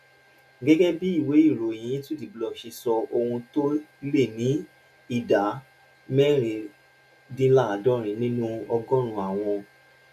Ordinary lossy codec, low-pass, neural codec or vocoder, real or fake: none; 14.4 kHz; none; real